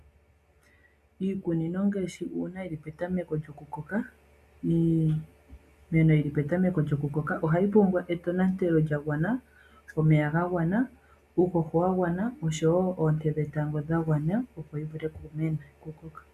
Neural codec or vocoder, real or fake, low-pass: none; real; 14.4 kHz